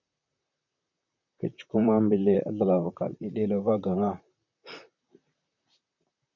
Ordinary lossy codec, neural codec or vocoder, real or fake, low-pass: MP3, 64 kbps; vocoder, 44.1 kHz, 128 mel bands, Pupu-Vocoder; fake; 7.2 kHz